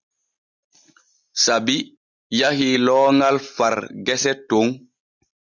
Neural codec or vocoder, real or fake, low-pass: none; real; 7.2 kHz